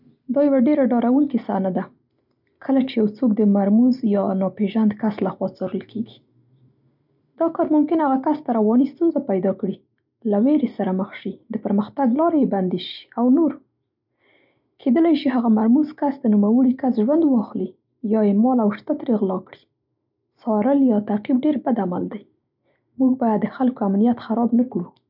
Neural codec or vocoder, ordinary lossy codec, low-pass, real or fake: none; none; 5.4 kHz; real